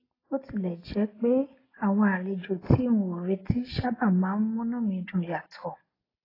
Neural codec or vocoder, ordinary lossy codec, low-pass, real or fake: none; AAC, 24 kbps; 5.4 kHz; real